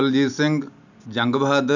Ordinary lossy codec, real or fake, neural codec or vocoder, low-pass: none; real; none; 7.2 kHz